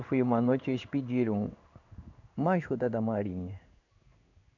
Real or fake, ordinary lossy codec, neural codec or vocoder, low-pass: fake; none; codec, 16 kHz in and 24 kHz out, 1 kbps, XY-Tokenizer; 7.2 kHz